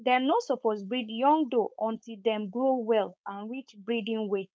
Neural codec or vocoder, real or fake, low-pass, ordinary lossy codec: codec, 16 kHz, 4.8 kbps, FACodec; fake; none; none